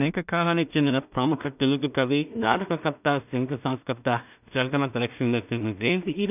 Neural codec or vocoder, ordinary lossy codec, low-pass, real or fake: codec, 16 kHz in and 24 kHz out, 0.4 kbps, LongCat-Audio-Codec, two codebook decoder; none; 3.6 kHz; fake